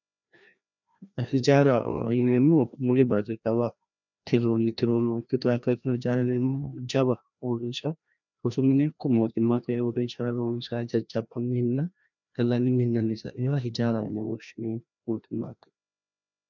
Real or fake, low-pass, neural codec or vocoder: fake; 7.2 kHz; codec, 16 kHz, 1 kbps, FreqCodec, larger model